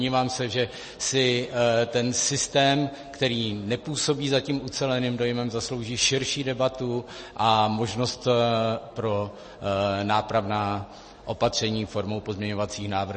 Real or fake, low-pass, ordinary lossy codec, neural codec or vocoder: real; 9.9 kHz; MP3, 32 kbps; none